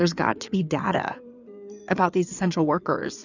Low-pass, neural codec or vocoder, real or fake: 7.2 kHz; codec, 16 kHz in and 24 kHz out, 2.2 kbps, FireRedTTS-2 codec; fake